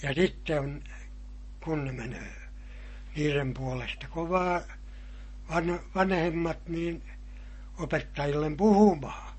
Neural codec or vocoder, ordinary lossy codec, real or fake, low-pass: none; MP3, 32 kbps; real; 10.8 kHz